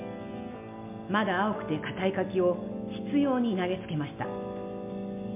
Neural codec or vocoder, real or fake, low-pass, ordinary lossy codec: none; real; 3.6 kHz; MP3, 32 kbps